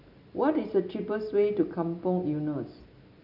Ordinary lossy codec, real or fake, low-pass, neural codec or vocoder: none; real; 5.4 kHz; none